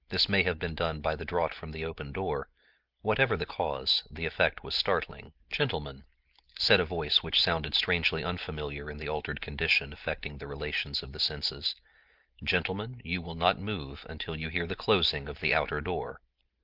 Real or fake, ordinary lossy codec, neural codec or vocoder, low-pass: real; Opus, 32 kbps; none; 5.4 kHz